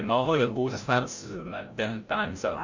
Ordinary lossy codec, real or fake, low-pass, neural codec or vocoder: Opus, 64 kbps; fake; 7.2 kHz; codec, 16 kHz, 0.5 kbps, FreqCodec, larger model